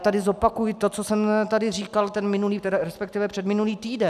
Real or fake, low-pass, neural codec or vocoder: real; 14.4 kHz; none